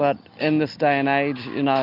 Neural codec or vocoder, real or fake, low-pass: none; real; 5.4 kHz